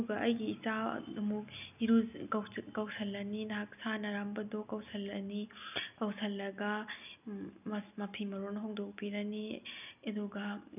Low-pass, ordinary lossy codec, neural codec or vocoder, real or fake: 3.6 kHz; none; none; real